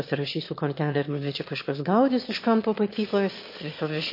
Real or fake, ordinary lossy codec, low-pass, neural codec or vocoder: fake; MP3, 32 kbps; 5.4 kHz; autoencoder, 22.05 kHz, a latent of 192 numbers a frame, VITS, trained on one speaker